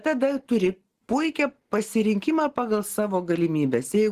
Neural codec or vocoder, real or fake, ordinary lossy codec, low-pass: none; real; Opus, 16 kbps; 14.4 kHz